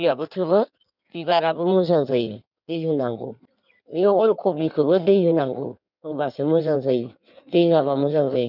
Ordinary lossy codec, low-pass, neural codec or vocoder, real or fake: none; 5.4 kHz; codec, 16 kHz in and 24 kHz out, 1.1 kbps, FireRedTTS-2 codec; fake